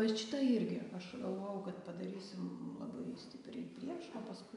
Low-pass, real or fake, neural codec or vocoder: 10.8 kHz; real; none